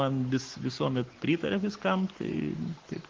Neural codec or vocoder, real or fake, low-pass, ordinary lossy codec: codec, 44.1 kHz, 7.8 kbps, Pupu-Codec; fake; 7.2 kHz; Opus, 16 kbps